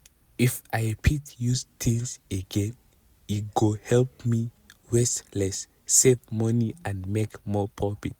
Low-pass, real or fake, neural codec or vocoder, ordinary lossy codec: none; real; none; none